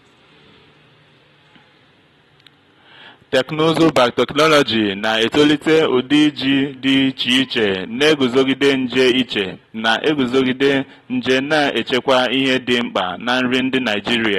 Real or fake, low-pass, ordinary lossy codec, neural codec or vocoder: real; 19.8 kHz; AAC, 32 kbps; none